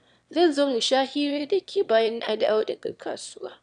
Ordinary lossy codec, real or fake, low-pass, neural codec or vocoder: none; fake; 9.9 kHz; autoencoder, 22.05 kHz, a latent of 192 numbers a frame, VITS, trained on one speaker